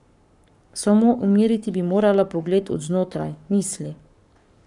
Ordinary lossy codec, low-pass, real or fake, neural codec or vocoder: none; 10.8 kHz; fake; codec, 44.1 kHz, 7.8 kbps, Pupu-Codec